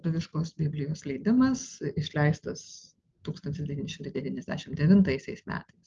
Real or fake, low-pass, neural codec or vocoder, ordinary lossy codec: real; 7.2 kHz; none; Opus, 24 kbps